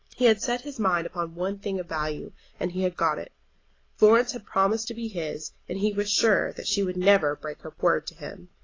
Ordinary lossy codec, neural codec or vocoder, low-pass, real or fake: AAC, 32 kbps; none; 7.2 kHz; real